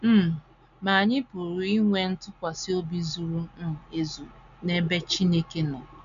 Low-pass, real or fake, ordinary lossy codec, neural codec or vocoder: 7.2 kHz; real; none; none